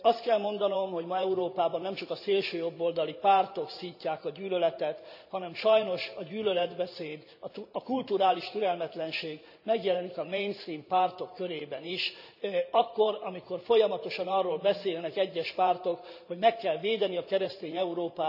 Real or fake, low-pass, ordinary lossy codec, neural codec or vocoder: fake; 5.4 kHz; none; vocoder, 44.1 kHz, 128 mel bands every 512 samples, BigVGAN v2